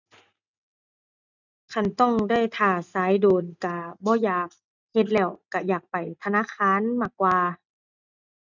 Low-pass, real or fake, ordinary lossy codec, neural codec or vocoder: 7.2 kHz; real; none; none